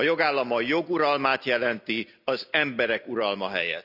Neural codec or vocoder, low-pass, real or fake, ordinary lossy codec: none; 5.4 kHz; real; none